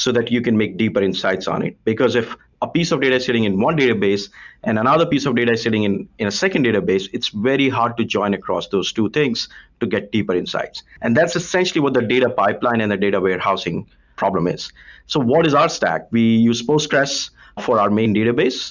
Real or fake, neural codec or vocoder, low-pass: real; none; 7.2 kHz